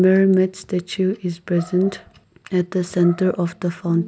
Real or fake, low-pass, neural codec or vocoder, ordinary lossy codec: real; none; none; none